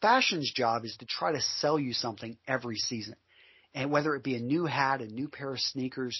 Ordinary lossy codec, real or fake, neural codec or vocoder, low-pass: MP3, 24 kbps; real; none; 7.2 kHz